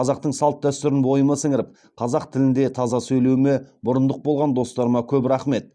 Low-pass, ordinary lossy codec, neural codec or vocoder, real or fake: 9.9 kHz; none; none; real